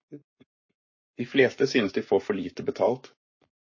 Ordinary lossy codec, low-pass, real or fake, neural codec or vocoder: MP3, 32 kbps; 7.2 kHz; fake; codec, 44.1 kHz, 7.8 kbps, Pupu-Codec